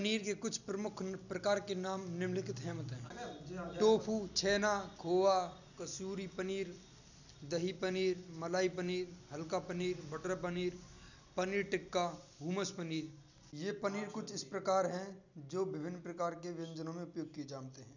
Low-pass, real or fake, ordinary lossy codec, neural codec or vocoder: 7.2 kHz; real; none; none